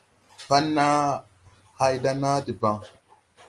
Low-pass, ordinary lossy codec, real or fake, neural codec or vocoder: 10.8 kHz; Opus, 24 kbps; real; none